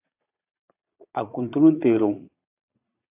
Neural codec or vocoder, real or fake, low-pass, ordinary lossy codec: vocoder, 22.05 kHz, 80 mel bands, Vocos; fake; 3.6 kHz; AAC, 24 kbps